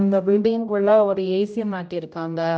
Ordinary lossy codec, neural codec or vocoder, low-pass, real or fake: none; codec, 16 kHz, 0.5 kbps, X-Codec, HuBERT features, trained on general audio; none; fake